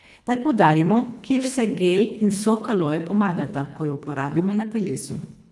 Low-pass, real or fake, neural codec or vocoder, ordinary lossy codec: none; fake; codec, 24 kHz, 1.5 kbps, HILCodec; none